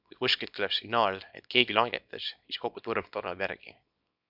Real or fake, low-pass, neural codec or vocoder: fake; 5.4 kHz; codec, 24 kHz, 0.9 kbps, WavTokenizer, small release